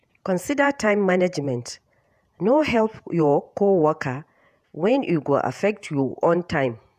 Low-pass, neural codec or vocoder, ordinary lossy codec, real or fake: 14.4 kHz; vocoder, 48 kHz, 128 mel bands, Vocos; none; fake